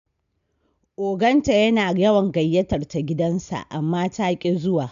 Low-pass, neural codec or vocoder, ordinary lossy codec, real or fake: 7.2 kHz; none; MP3, 64 kbps; real